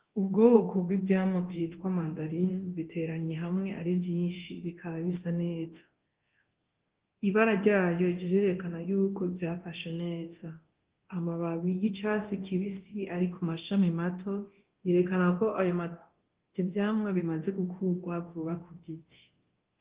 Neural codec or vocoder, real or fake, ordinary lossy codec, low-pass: codec, 24 kHz, 0.9 kbps, DualCodec; fake; Opus, 16 kbps; 3.6 kHz